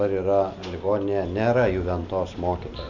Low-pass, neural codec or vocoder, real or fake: 7.2 kHz; none; real